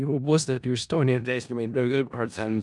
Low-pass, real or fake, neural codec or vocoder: 10.8 kHz; fake; codec, 16 kHz in and 24 kHz out, 0.4 kbps, LongCat-Audio-Codec, four codebook decoder